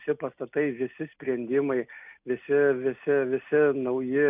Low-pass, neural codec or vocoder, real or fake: 3.6 kHz; none; real